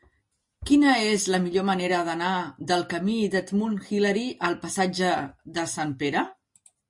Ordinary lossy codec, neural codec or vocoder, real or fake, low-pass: MP3, 48 kbps; none; real; 10.8 kHz